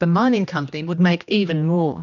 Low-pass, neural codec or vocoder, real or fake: 7.2 kHz; codec, 16 kHz, 1 kbps, X-Codec, HuBERT features, trained on general audio; fake